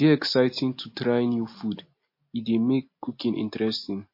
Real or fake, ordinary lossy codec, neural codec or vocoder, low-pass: real; MP3, 32 kbps; none; 5.4 kHz